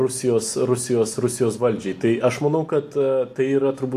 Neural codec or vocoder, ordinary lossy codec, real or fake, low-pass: none; AAC, 64 kbps; real; 14.4 kHz